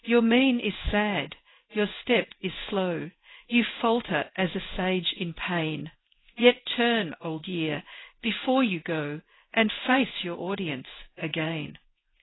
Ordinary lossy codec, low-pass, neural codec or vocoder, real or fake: AAC, 16 kbps; 7.2 kHz; codec, 16 kHz in and 24 kHz out, 1 kbps, XY-Tokenizer; fake